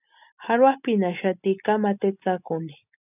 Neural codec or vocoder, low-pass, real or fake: none; 3.6 kHz; real